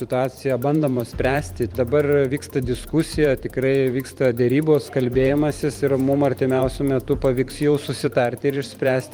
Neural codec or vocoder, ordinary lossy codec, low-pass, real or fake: vocoder, 44.1 kHz, 128 mel bands every 512 samples, BigVGAN v2; Opus, 32 kbps; 19.8 kHz; fake